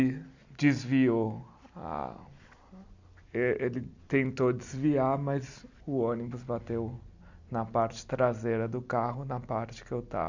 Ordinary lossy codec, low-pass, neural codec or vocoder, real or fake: Opus, 64 kbps; 7.2 kHz; none; real